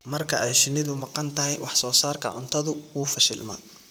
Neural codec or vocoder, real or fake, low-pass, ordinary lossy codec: vocoder, 44.1 kHz, 128 mel bands, Pupu-Vocoder; fake; none; none